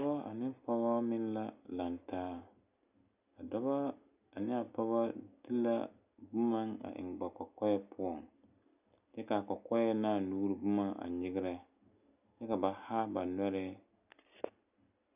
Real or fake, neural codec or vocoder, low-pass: real; none; 3.6 kHz